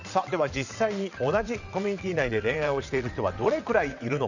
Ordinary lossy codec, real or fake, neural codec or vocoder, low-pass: none; fake; vocoder, 22.05 kHz, 80 mel bands, WaveNeXt; 7.2 kHz